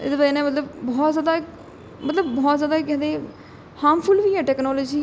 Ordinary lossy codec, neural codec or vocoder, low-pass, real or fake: none; none; none; real